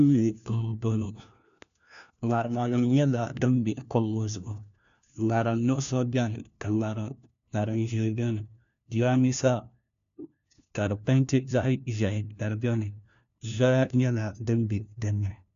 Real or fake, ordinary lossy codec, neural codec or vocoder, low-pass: fake; AAC, 96 kbps; codec, 16 kHz, 1 kbps, FunCodec, trained on LibriTTS, 50 frames a second; 7.2 kHz